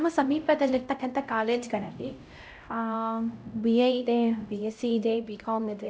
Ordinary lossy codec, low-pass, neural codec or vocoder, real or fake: none; none; codec, 16 kHz, 0.5 kbps, X-Codec, HuBERT features, trained on LibriSpeech; fake